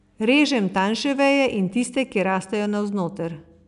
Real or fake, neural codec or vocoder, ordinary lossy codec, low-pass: real; none; none; 10.8 kHz